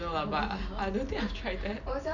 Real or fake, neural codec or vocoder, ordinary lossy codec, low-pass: real; none; none; 7.2 kHz